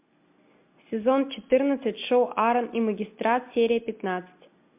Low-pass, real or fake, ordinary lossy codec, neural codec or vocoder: 3.6 kHz; real; MP3, 32 kbps; none